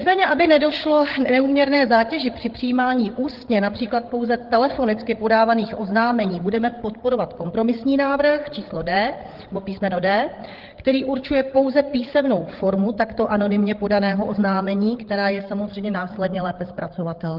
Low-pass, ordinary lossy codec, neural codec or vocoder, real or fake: 5.4 kHz; Opus, 16 kbps; codec, 16 kHz, 8 kbps, FreqCodec, larger model; fake